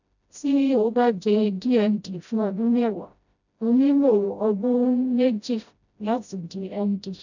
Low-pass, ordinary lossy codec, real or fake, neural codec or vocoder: 7.2 kHz; none; fake; codec, 16 kHz, 0.5 kbps, FreqCodec, smaller model